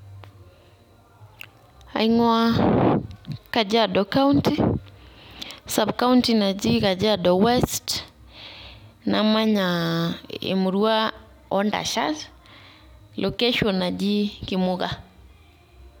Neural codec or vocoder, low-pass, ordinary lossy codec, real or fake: none; 19.8 kHz; none; real